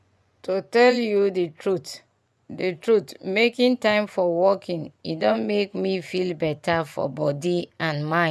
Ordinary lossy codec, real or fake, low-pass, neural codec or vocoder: none; fake; none; vocoder, 24 kHz, 100 mel bands, Vocos